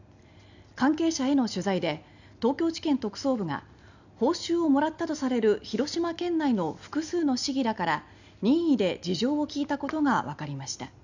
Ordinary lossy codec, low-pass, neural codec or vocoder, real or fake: none; 7.2 kHz; none; real